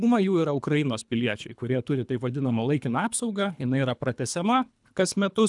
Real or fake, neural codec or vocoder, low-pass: fake; codec, 24 kHz, 3 kbps, HILCodec; 10.8 kHz